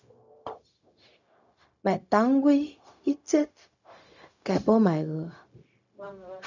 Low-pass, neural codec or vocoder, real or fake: 7.2 kHz; codec, 16 kHz, 0.4 kbps, LongCat-Audio-Codec; fake